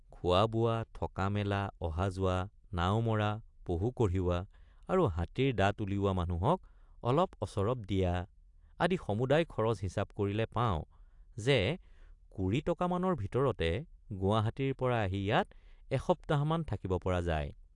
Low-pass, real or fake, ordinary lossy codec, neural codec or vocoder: 10.8 kHz; real; AAC, 64 kbps; none